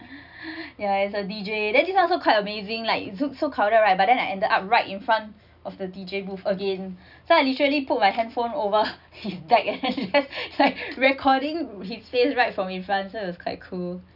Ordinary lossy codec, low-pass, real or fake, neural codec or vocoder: none; 5.4 kHz; real; none